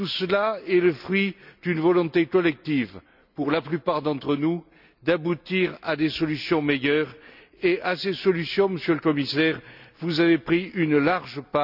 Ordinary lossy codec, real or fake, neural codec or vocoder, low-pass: none; real; none; 5.4 kHz